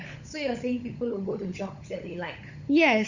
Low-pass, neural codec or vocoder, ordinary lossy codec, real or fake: 7.2 kHz; codec, 16 kHz, 16 kbps, FunCodec, trained on LibriTTS, 50 frames a second; Opus, 64 kbps; fake